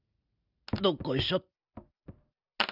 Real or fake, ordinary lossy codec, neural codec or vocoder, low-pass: real; none; none; 5.4 kHz